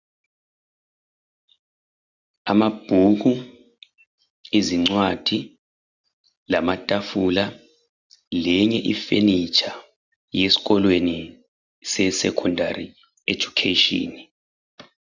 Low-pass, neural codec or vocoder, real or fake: 7.2 kHz; none; real